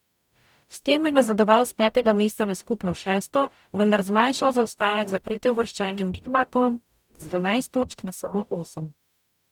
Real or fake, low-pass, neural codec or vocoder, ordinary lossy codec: fake; 19.8 kHz; codec, 44.1 kHz, 0.9 kbps, DAC; none